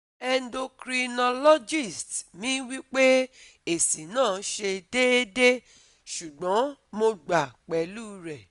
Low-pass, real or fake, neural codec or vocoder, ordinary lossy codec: 10.8 kHz; real; none; none